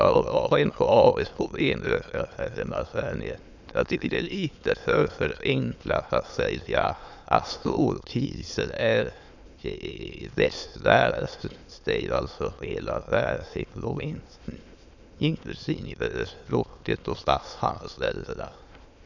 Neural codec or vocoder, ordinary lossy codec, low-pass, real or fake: autoencoder, 22.05 kHz, a latent of 192 numbers a frame, VITS, trained on many speakers; none; 7.2 kHz; fake